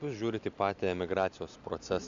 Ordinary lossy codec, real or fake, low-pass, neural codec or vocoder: Opus, 64 kbps; real; 7.2 kHz; none